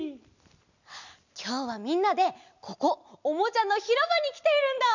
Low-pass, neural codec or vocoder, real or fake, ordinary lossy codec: 7.2 kHz; none; real; none